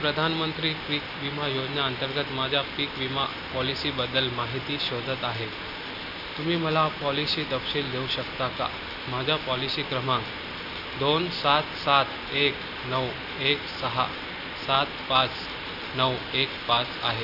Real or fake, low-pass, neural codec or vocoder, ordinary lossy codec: real; 5.4 kHz; none; none